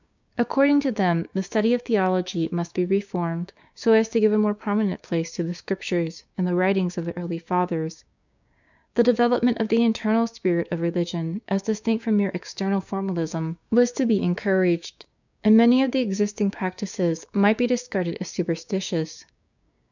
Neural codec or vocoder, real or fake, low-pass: codec, 16 kHz, 6 kbps, DAC; fake; 7.2 kHz